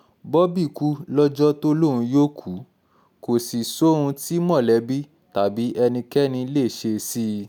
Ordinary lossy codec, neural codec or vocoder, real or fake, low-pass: none; none; real; none